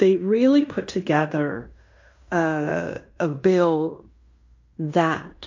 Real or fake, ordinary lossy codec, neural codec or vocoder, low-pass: fake; MP3, 48 kbps; codec, 16 kHz in and 24 kHz out, 0.9 kbps, LongCat-Audio-Codec, fine tuned four codebook decoder; 7.2 kHz